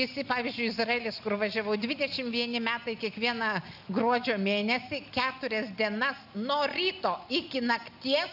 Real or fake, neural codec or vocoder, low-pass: real; none; 5.4 kHz